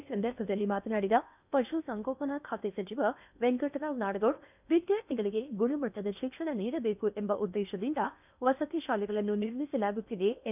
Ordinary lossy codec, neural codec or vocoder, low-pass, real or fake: none; codec, 16 kHz in and 24 kHz out, 0.8 kbps, FocalCodec, streaming, 65536 codes; 3.6 kHz; fake